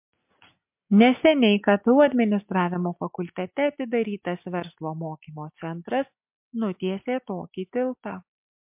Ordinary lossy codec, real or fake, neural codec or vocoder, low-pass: MP3, 32 kbps; real; none; 3.6 kHz